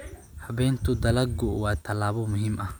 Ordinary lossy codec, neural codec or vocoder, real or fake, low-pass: none; none; real; none